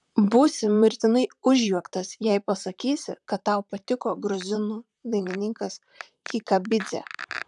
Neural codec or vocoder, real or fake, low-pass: vocoder, 44.1 kHz, 128 mel bands, Pupu-Vocoder; fake; 10.8 kHz